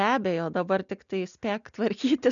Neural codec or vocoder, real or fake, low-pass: none; real; 7.2 kHz